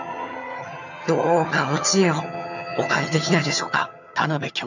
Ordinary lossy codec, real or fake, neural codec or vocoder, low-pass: none; fake; vocoder, 22.05 kHz, 80 mel bands, HiFi-GAN; 7.2 kHz